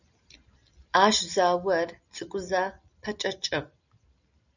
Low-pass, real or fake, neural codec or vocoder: 7.2 kHz; real; none